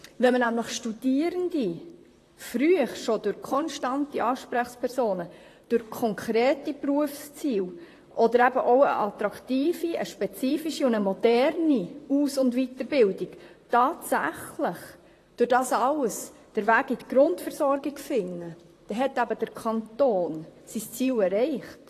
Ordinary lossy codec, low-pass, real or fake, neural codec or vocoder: AAC, 48 kbps; 14.4 kHz; fake; vocoder, 44.1 kHz, 128 mel bands, Pupu-Vocoder